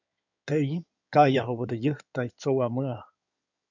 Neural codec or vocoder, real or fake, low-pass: codec, 16 kHz in and 24 kHz out, 2.2 kbps, FireRedTTS-2 codec; fake; 7.2 kHz